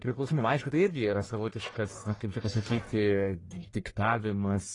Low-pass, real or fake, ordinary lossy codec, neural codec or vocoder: 10.8 kHz; fake; AAC, 32 kbps; codec, 44.1 kHz, 1.7 kbps, Pupu-Codec